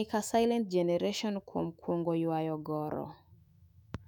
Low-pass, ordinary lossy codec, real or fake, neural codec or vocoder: 19.8 kHz; none; fake; autoencoder, 48 kHz, 128 numbers a frame, DAC-VAE, trained on Japanese speech